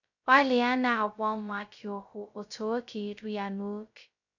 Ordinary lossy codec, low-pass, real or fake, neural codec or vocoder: none; 7.2 kHz; fake; codec, 16 kHz, 0.2 kbps, FocalCodec